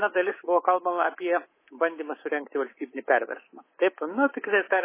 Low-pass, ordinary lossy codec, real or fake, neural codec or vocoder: 3.6 kHz; MP3, 16 kbps; fake; codec, 24 kHz, 3.1 kbps, DualCodec